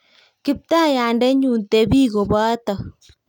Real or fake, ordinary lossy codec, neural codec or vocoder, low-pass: real; none; none; 19.8 kHz